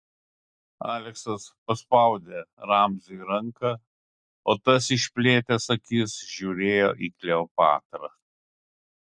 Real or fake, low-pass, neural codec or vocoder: real; 9.9 kHz; none